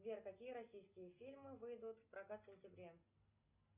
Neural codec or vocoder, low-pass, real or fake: none; 3.6 kHz; real